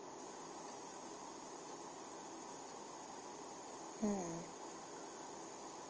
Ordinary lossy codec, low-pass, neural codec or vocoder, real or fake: Opus, 24 kbps; 7.2 kHz; none; real